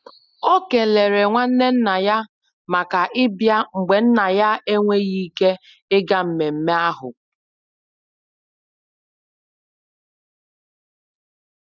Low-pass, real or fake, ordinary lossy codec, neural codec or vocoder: 7.2 kHz; real; none; none